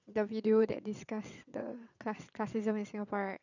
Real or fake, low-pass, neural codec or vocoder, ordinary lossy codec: fake; 7.2 kHz; vocoder, 22.05 kHz, 80 mel bands, WaveNeXt; none